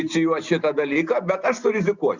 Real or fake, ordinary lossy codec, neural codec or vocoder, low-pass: real; Opus, 64 kbps; none; 7.2 kHz